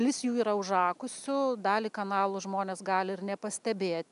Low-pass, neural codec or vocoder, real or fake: 10.8 kHz; none; real